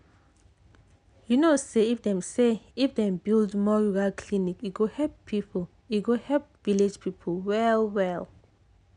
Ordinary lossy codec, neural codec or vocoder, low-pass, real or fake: none; none; 9.9 kHz; real